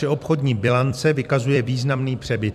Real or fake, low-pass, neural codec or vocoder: fake; 14.4 kHz; vocoder, 44.1 kHz, 128 mel bands every 256 samples, BigVGAN v2